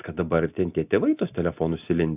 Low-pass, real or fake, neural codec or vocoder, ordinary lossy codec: 3.6 kHz; real; none; AAC, 32 kbps